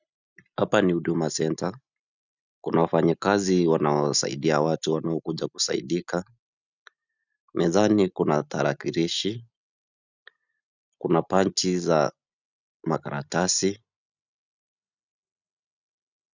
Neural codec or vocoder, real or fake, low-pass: none; real; 7.2 kHz